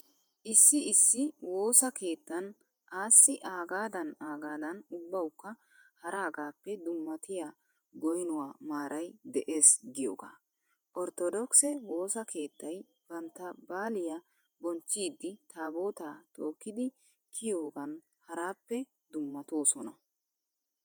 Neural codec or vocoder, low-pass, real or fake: vocoder, 48 kHz, 128 mel bands, Vocos; 19.8 kHz; fake